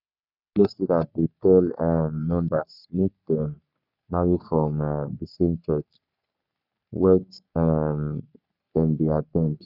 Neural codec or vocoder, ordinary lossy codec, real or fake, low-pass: codec, 44.1 kHz, 3.4 kbps, Pupu-Codec; none; fake; 5.4 kHz